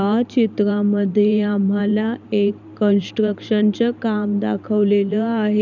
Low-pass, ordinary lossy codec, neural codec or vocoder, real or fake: 7.2 kHz; none; vocoder, 44.1 kHz, 128 mel bands every 512 samples, BigVGAN v2; fake